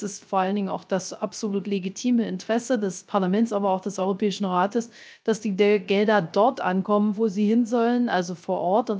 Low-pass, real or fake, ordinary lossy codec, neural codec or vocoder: none; fake; none; codec, 16 kHz, 0.3 kbps, FocalCodec